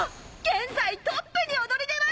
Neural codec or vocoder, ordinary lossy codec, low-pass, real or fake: none; none; none; real